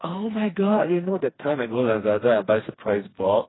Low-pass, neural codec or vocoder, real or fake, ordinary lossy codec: 7.2 kHz; codec, 16 kHz, 2 kbps, FreqCodec, smaller model; fake; AAC, 16 kbps